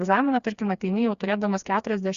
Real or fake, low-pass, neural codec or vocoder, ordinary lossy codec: fake; 7.2 kHz; codec, 16 kHz, 2 kbps, FreqCodec, smaller model; Opus, 64 kbps